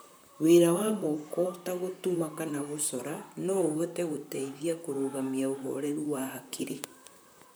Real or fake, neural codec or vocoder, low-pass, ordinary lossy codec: fake; vocoder, 44.1 kHz, 128 mel bands, Pupu-Vocoder; none; none